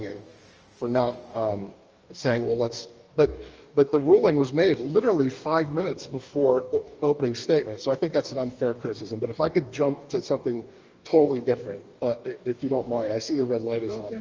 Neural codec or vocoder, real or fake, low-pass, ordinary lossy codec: codec, 44.1 kHz, 2.6 kbps, DAC; fake; 7.2 kHz; Opus, 24 kbps